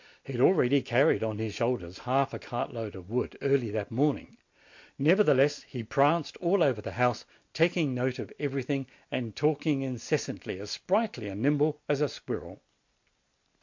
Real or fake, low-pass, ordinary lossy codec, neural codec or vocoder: real; 7.2 kHz; MP3, 48 kbps; none